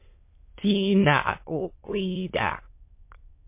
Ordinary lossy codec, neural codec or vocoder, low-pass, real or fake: MP3, 24 kbps; autoencoder, 22.05 kHz, a latent of 192 numbers a frame, VITS, trained on many speakers; 3.6 kHz; fake